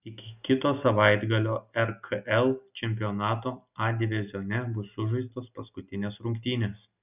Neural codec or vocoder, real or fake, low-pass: none; real; 3.6 kHz